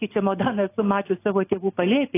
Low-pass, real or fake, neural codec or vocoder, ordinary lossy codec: 3.6 kHz; real; none; AAC, 24 kbps